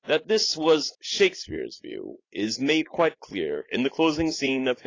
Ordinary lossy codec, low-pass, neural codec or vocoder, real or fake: AAC, 32 kbps; 7.2 kHz; vocoder, 44.1 kHz, 80 mel bands, Vocos; fake